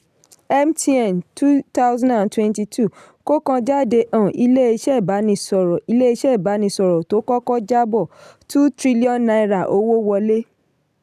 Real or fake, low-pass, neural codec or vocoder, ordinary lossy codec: real; 14.4 kHz; none; none